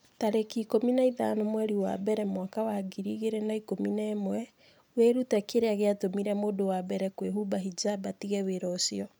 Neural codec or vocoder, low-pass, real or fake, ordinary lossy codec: none; none; real; none